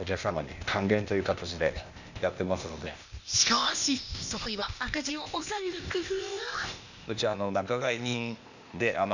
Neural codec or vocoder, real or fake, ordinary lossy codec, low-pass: codec, 16 kHz, 0.8 kbps, ZipCodec; fake; none; 7.2 kHz